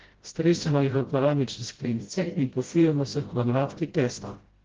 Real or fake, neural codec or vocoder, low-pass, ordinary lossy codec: fake; codec, 16 kHz, 0.5 kbps, FreqCodec, smaller model; 7.2 kHz; Opus, 16 kbps